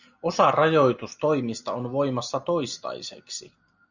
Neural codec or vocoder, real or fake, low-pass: none; real; 7.2 kHz